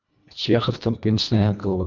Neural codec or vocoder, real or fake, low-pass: codec, 24 kHz, 1.5 kbps, HILCodec; fake; 7.2 kHz